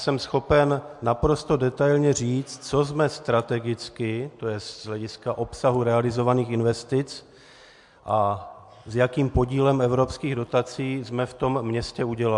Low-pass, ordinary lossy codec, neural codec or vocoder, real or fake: 10.8 kHz; MP3, 64 kbps; none; real